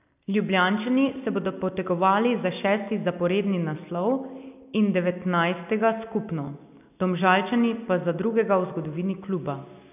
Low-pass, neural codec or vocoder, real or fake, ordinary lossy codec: 3.6 kHz; none; real; none